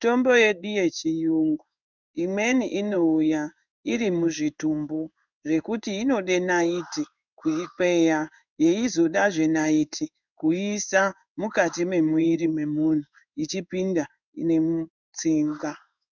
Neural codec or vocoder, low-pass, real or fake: codec, 16 kHz in and 24 kHz out, 1 kbps, XY-Tokenizer; 7.2 kHz; fake